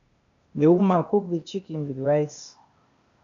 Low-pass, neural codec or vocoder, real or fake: 7.2 kHz; codec, 16 kHz, 0.8 kbps, ZipCodec; fake